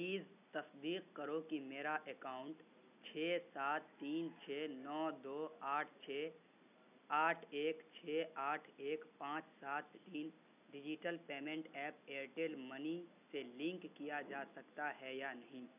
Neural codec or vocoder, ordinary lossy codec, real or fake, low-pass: none; none; real; 3.6 kHz